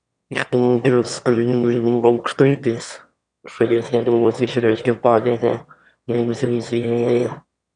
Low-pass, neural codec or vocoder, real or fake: 9.9 kHz; autoencoder, 22.05 kHz, a latent of 192 numbers a frame, VITS, trained on one speaker; fake